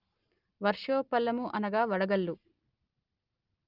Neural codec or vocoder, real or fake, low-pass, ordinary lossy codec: none; real; 5.4 kHz; Opus, 24 kbps